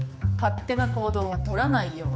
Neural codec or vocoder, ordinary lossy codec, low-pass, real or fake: codec, 16 kHz, 4 kbps, X-Codec, HuBERT features, trained on general audio; none; none; fake